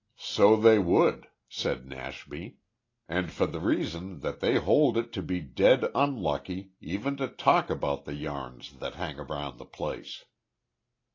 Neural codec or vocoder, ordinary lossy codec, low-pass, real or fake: none; AAC, 32 kbps; 7.2 kHz; real